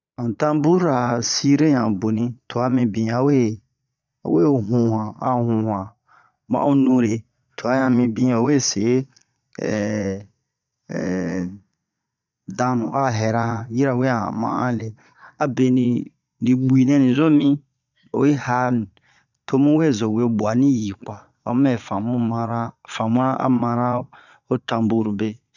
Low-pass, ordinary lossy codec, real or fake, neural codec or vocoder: 7.2 kHz; none; fake; vocoder, 22.05 kHz, 80 mel bands, Vocos